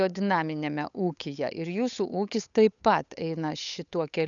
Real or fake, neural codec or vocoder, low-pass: fake; codec, 16 kHz, 8 kbps, FunCodec, trained on Chinese and English, 25 frames a second; 7.2 kHz